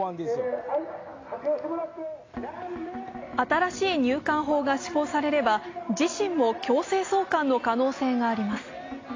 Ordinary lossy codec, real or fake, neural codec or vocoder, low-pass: AAC, 32 kbps; real; none; 7.2 kHz